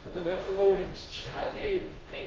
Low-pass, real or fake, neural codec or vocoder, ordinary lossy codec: 7.2 kHz; fake; codec, 16 kHz, 0.5 kbps, FunCodec, trained on Chinese and English, 25 frames a second; Opus, 32 kbps